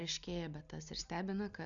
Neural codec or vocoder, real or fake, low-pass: none; real; 7.2 kHz